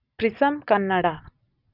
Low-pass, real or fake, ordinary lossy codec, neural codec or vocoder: 5.4 kHz; real; none; none